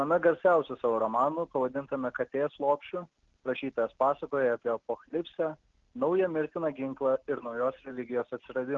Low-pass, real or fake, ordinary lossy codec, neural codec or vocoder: 7.2 kHz; real; Opus, 16 kbps; none